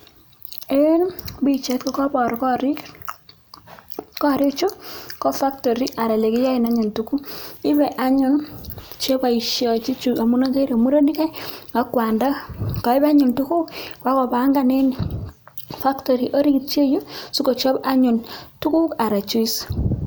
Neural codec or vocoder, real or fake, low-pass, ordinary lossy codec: none; real; none; none